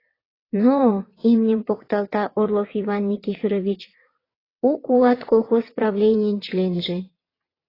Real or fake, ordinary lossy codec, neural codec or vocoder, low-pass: fake; AAC, 24 kbps; vocoder, 22.05 kHz, 80 mel bands, WaveNeXt; 5.4 kHz